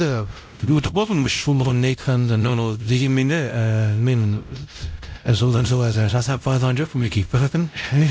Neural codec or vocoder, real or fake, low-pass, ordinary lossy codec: codec, 16 kHz, 0.5 kbps, X-Codec, WavLM features, trained on Multilingual LibriSpeech; fake; none; none